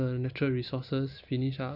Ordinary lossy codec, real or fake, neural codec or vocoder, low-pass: none; real; none; 5.4 kHz